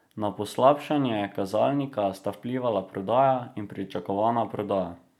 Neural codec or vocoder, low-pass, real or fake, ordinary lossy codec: none; 19.8 kHz; real; none